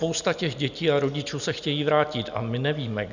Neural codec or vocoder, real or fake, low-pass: none; real; 7.2 kHz